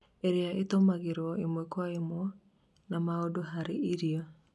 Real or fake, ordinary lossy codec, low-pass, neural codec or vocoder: real; none; none; none